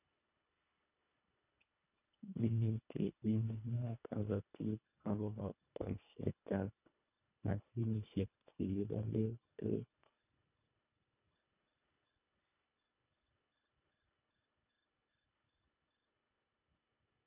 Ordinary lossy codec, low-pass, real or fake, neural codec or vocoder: none; 3.6 kHz; fake; codec, 24 kHz, 1.5 kbps, HILCodec